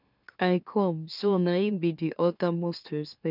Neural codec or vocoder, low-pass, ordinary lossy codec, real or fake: autoencoder, 44.1 kHz, a latent of 192 numbers a frame, MeloTTS; 5.4 kHz; none; fake